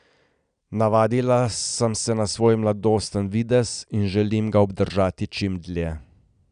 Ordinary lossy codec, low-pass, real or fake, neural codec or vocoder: none; 9.9 kHz; real; none